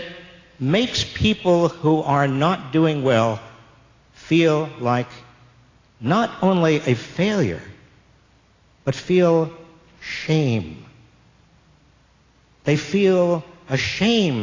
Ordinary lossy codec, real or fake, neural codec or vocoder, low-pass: AAC, 32 kbps; real; none; 7.2 kHz